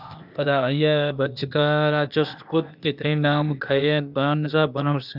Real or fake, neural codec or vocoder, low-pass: fake; codec, 16 kHz, 0.8 kbps, ZipCodec; 5.4 kHz